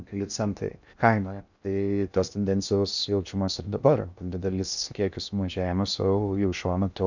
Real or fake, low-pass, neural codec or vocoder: fake; 7.2 kHz; codec, 16 kHz in and 24 kHz out, 0.6 kbps, FocalCodec, streaming, 2048 codes